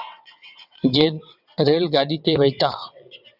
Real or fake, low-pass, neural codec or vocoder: fake; 5.4 kHz; vocoder, 22.05 kHz, 80 mel bands, WaveNeXt